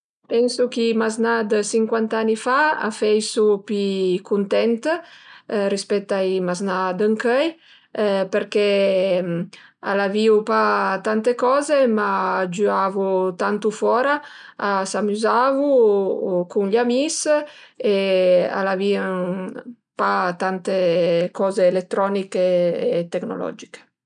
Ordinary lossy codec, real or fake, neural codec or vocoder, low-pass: none; real; none; 9.9 kHz